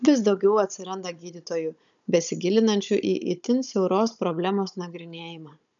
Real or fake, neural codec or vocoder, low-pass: fake; codec, 16 kHz, 16 kbps, FunCodec, trained on Chinese and English, 50 frames a second; 7.2 kHz